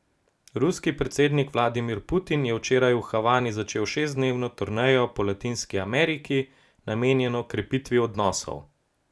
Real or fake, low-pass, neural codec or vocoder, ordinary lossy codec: real; none; none; none